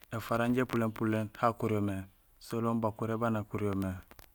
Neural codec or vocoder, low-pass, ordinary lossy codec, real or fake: none; none; none; real